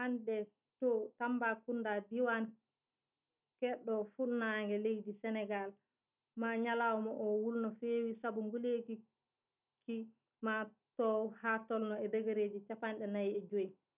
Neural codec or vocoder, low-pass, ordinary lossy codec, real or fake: none; 3.6 kHz; none; real